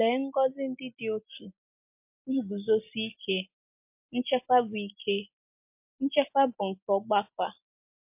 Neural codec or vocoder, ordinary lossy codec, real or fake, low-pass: none; MP3, 32 kbps; real; 3.6 kHz